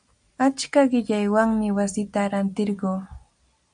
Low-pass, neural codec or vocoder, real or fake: 9.9 kHz; none; real